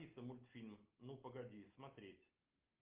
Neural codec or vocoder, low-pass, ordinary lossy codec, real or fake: none; 3.6 kHz; Opus, 32 kbps; real